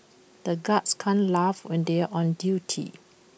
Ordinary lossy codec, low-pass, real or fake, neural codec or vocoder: none; none; real; none